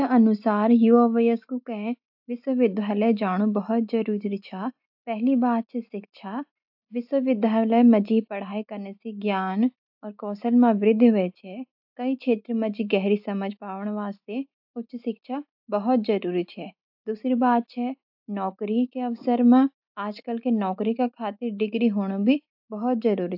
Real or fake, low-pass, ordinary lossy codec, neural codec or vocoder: real; 5.4 kHz; AAC, 48 kbps; none